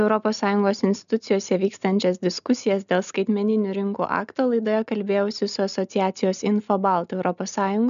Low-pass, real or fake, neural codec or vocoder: 7.2 kHz; real; none